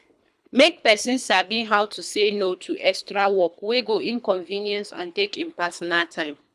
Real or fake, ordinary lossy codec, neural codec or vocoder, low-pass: fake; none; codec, 24 kHz, 3 kbps, HILCodec; none